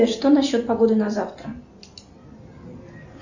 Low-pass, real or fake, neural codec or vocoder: 7.2 kHz; real; none